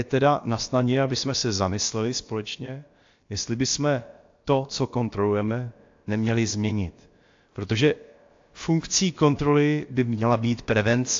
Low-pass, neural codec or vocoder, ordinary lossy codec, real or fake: 7.2 kHz; codec, 16 kHz, about 1 kbps, DyCAST, with the encoder's durations; AAC, 48 kbps; fake